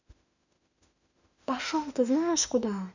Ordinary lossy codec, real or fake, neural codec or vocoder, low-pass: none; fake; autoencoder, 48 kHz, 32 numbers a frame, DAC-VAE, trained on Japanese speech; 7.2 kHz